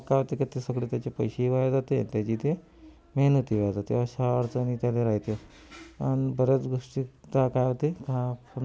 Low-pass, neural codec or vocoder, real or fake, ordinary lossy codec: none; none; real; none